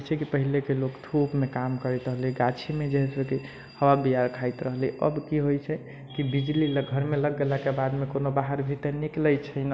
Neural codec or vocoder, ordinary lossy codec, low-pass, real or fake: none; none; none; real